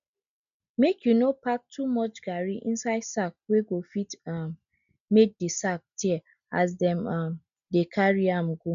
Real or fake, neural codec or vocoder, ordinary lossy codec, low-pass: real; none; none; 7.2 kHz